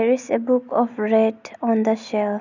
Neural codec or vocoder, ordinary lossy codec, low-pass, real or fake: none; none; 7.2 kHz; real